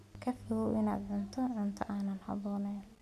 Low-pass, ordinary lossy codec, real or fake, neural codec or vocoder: 14.4 kHz; none; real; none